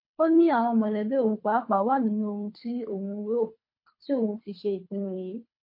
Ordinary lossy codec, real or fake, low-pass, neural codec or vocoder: MP3, 32 kbps; fake; 5.4 kHz; codec, 24 kHz, 3 kbps, HILCodec